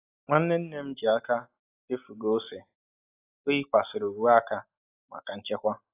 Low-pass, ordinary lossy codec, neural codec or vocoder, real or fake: 3.6 kHz; AAC, 24 kbps; none; real